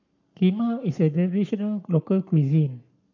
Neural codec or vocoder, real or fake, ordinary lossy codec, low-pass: codec, 44.1 kHz, 7.8 kbps, Pupu-Codec; fake; none; 7.2 kHz